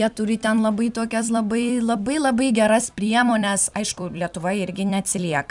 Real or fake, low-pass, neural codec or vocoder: fake; 10.8 kHz; vocoder, 44.1 kHz, 128 mel bands every 256 samples, BigVGAN v2